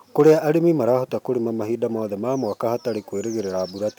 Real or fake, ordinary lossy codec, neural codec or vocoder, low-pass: real; none; none; 19.8 kHz